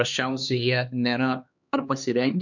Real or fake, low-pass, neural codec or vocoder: fake; 7.2 kHz; codec, 16 kHz, 2 kbps, X-Codec, HuBERT features, trained on LibriSpeech